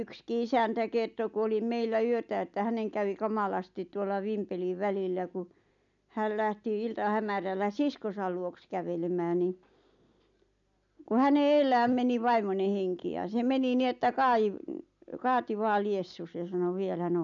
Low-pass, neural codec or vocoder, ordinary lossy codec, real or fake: 7.2 kHz; none; none; real